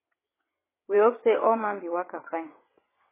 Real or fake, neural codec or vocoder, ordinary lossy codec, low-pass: fake; vocoder, 22.05 kHz, 80 mel bands, WaveNeXt; MP3, 16 kbps; 3.6 kHz